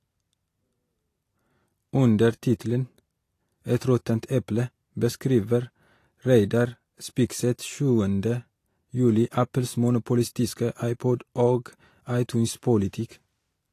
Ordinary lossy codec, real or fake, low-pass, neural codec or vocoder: AAC, 48 kbps; real; 14.4 kHz; none